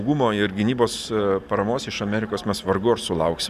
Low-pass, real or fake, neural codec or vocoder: 14.4 kHz; real; none